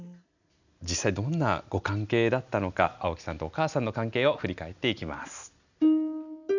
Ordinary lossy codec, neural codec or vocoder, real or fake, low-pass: none; none; real; 7.2 kHz